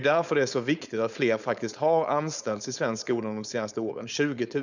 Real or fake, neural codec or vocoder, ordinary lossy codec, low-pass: fake; codec, 16 kHz, 4.8 kbps, FACodec; none; 7.2 kHz